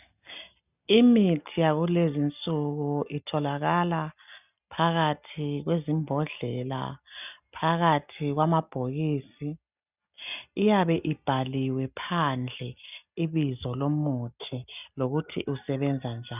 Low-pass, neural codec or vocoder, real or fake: 3.6 kHz; none; real